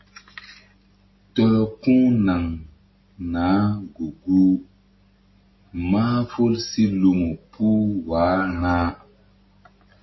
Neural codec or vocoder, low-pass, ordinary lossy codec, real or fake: none; 7.2 kHz; MP3, 24 kbps; real